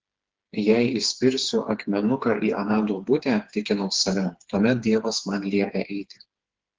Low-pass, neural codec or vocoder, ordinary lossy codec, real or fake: 7.2 kHz; codec, 16 kHz, 4 kbps, FreqCodec, smaller model; Opus, 16 kbps; fake